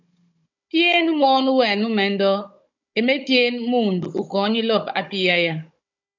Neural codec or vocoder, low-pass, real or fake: codec, 16 kHz, 16 kbps, FunCodec, trained on Chinese and English, 50 frames a second; 7.2 kHz; fake